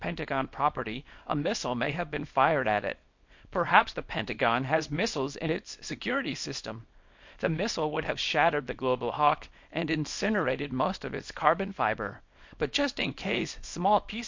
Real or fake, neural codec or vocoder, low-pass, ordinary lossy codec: fake; codec, 24 kHz, 0.9 kbps, WavTokenizer, small release; 7.2 kHz; MP3, 48 kbps